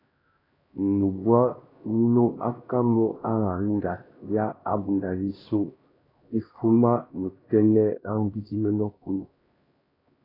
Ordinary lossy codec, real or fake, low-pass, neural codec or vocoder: AAC, 24 kbps; fake; 5.4 kHz; codec, 16 kHz, 1 kbps, X-Codec, HuBERT features, trained on LibriSpeech